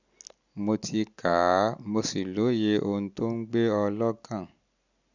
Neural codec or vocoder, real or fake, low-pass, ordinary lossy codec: none; real; 7.2 kHz; none